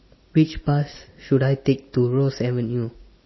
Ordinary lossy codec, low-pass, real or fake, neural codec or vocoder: MP3, 24 kbps; 7.2 kHz; real; none